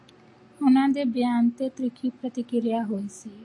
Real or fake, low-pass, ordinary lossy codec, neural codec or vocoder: real; 10.8 kHz; AAC, 48 kbps; none